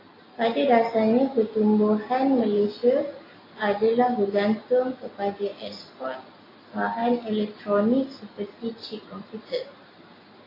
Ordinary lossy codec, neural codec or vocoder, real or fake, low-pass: AAC, 24 kbps; none; real; 5.4 kHz